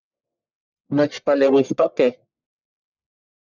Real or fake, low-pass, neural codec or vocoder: fake; 7.2 kHz; codec, 44.1 kHz, 1.7 kbps, Pupu-Codec